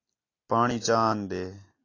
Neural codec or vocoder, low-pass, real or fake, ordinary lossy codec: none; 7.2 kHz; real; AAC, 32 kbps